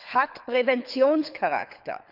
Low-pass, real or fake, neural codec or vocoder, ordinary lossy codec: 5.4 kHz; fake; codec, 24 kHz, 6 kbps, HILCodec; none